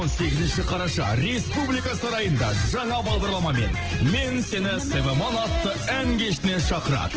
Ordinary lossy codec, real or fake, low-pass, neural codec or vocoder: Opus, 16 kbps; real; 7.2 kHz; none